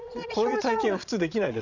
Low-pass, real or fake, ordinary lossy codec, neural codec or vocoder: 7.2 kHz; fake; none; vocoder, 22.05 kHz, 80 mel bands, Vocos